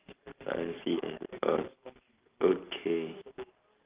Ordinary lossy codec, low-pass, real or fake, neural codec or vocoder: Opus, 24 kbps; 3.6 kHz; real; none